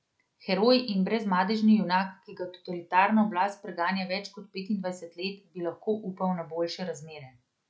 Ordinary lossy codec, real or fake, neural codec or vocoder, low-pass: none; real; none; none